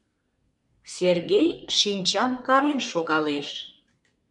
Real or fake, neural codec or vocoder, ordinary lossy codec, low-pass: fake; codec, 24 kHz, 1 kbps, SNAC; MP3, 96 kbps; 10.8 kHz